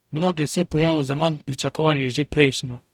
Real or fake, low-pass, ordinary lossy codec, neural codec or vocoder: fake; 19.8 kHz; none; codec, 44.1 kHz, 0.9 kbps, DAC